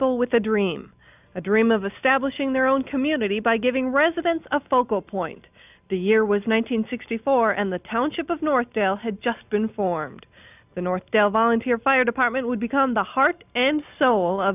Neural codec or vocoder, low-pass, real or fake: none; 3.6 kHz; real